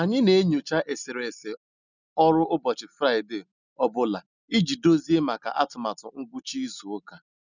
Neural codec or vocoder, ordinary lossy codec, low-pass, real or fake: none; none; 7.2 kHz; real